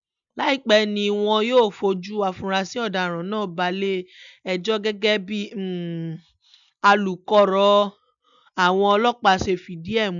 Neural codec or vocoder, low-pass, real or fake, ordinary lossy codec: none; 7.2 kHz; real; none